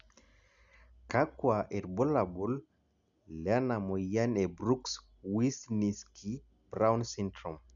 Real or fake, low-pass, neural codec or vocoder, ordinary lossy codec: real; 7.2 kHz; none; none